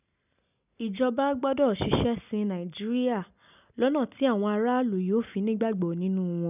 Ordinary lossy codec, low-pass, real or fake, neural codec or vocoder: none; 3.6 kHz; real; none